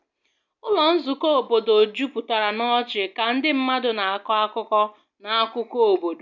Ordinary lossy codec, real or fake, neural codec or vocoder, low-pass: none; real; none; 7.2 kHz